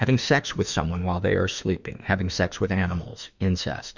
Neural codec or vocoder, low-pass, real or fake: autoencoder, 48 kHz, 32 numbers a frame, DAC-VAE, trained on Japanese speech; 7.2 kHz; fake